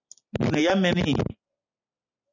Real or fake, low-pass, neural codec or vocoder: real; 7.2 kHz; none